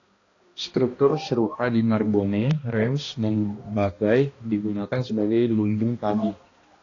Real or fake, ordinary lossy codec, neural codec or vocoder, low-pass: fake; AAC, 32 kbps; codec, 16 kHz, 1 kbps, X-Codec, HuBERT features, trained on balanced general audio; 7.2 kHz